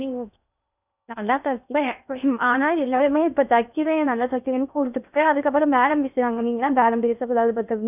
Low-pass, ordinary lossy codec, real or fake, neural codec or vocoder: 3.6 kHz; none; fake; codec, 16 kHz in and 24 kHz out, 0.6 kbps, FocalCodec, streaming, 2048 codes